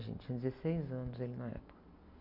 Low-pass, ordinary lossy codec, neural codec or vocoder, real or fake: 5.4 kHz; none; none; real